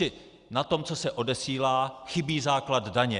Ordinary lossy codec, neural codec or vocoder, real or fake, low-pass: Opus, 64 kbps; none; real; 10.8 kHz